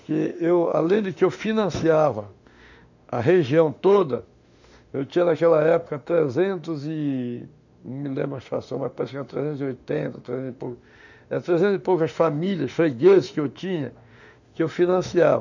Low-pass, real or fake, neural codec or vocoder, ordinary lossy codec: 7.2 kHz; fake; autoencoder, 48 kHz, 32 numbers a frame, DAC-VAE, trained on Japanese speech; none